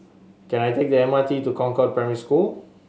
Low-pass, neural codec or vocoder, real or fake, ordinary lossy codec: none; none; real; none